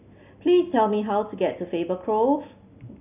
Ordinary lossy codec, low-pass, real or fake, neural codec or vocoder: none; 3.6 kHz; real; none